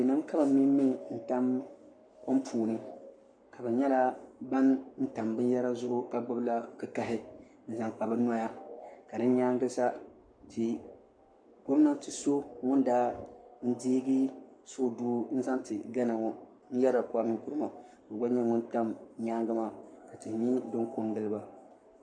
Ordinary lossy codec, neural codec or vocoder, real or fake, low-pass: MP3, 96 kbps; codec, 44.1 kHz, 7.8 kbps, Pupu-Codec; fake; 9.9 kHz